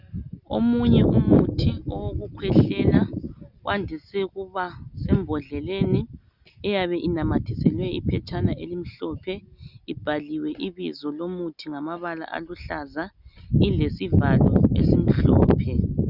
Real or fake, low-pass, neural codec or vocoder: real; 5.4 kHz; none